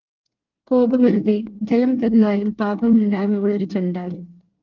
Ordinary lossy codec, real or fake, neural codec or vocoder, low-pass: Opus, 32 kbps; fake; codec, 24 kHz, 1 kbps, SNAC; 7.2 kHz